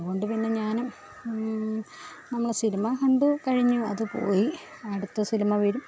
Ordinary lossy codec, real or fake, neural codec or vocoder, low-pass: none; real; none; none